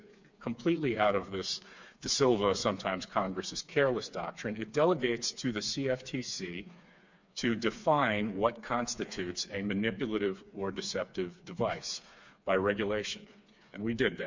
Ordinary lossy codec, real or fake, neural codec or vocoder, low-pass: MP3, 64 kbps; fake; codec, 16 kHz, 4 kbps, FreqCodec, smaller model; 7.2 kHz